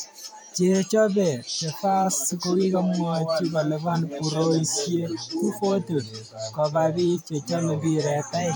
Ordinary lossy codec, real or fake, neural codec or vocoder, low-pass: none; fake; vocoder, 44.1 kHz, 128 mel bands every 512 samples, BigVGAN v2; none